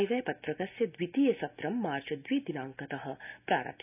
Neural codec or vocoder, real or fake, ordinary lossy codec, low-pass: none; real; none; 3.6 kHz